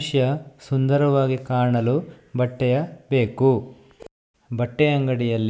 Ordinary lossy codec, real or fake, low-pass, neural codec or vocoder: none; real; none; none